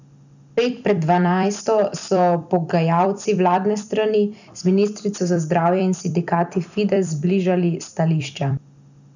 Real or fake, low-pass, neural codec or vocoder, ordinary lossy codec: fake; 7.2 kHz; vocoder, 44.1 kHz, 128 mel bands every 256 samples, BigVGAN v2; none